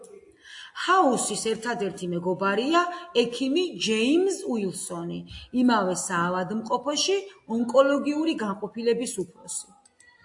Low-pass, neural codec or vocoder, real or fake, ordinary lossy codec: 10.8 kHz; none; real; AAC, 64 kbps